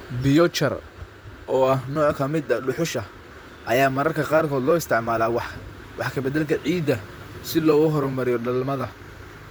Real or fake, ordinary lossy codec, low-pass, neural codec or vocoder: fake; none; none; vocoder, 44.1 kHz, 128 mel bands, Pupu-Vocoder